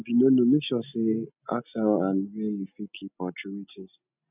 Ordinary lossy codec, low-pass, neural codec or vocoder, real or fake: none; 3.6 kHz; none; real